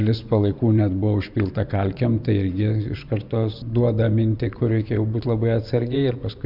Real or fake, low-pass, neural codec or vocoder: fake; 5.4 kHz; vocoder, 24 kHz, 100 mel bands, Vocos